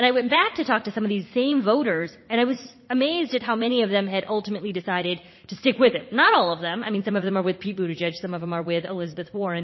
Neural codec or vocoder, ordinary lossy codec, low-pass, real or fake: vocoder, 44.1 kHz, 80 mel bands, Vocos; MP3, 24 kbps; 7.2 kHz; fake